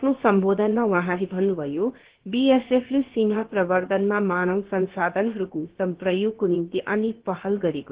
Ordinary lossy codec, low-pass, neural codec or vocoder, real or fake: Opus, 16 kbps; 3.6 kHz; codec, 16 kHz, about 1 kbps, DyCAST, with the encoder's durations; fake